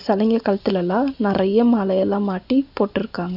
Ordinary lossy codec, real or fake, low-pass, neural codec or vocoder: none; real; 5.4 kHz; none